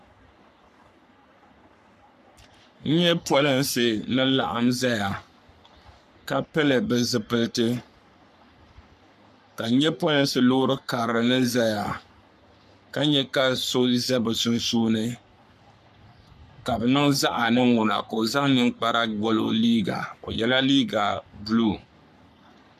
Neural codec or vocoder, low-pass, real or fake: codec, 44.1 kHz, 3.4 kbps, Pupu-Codec; 14.4 kHz; fake